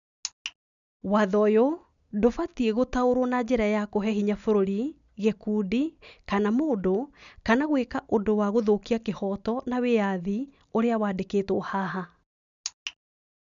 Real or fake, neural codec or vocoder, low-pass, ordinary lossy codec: real; none; 7.2 kHz; none